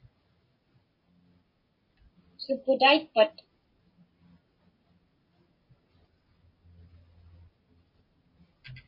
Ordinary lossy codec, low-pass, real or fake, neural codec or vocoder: MP3, 24 kbps; 5.4 kHz; real; none